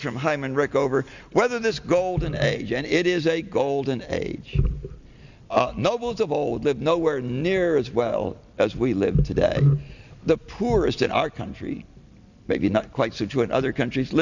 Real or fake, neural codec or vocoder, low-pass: real; none; 7.2 kHz